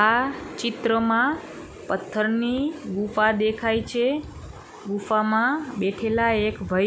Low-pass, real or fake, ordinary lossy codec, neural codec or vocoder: none; real; none; none